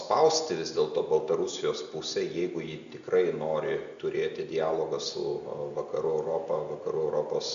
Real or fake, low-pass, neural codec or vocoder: real; 7.2 kHz; none